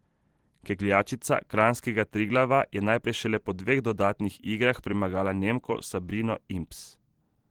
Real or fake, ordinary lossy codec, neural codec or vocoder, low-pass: fake; Opus, 16 kbps; vocoder, 44.1 kHz, 128 mel bands every 512 samples, BigVGAN v2; 19.8 kHz